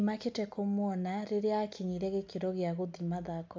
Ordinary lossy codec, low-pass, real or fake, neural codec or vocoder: none; none; real; none